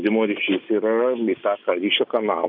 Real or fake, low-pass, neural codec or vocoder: real; 5.4 kHz; none